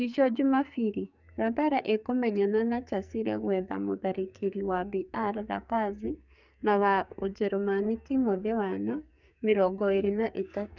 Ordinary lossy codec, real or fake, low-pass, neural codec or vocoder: none; fake; 7.2 kHz; codec, 44.1 kHz, 2.6 kbps, SNAC